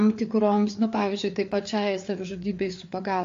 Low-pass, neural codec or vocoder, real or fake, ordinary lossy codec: 7.2 kHz; codec, 16 kHz, 4 kbps, FreqCodec, larger model; fake; AAC, 48 kbps